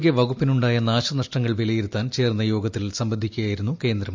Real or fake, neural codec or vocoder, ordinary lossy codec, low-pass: real; none; MP3, 64 kbps; 7.2 kHz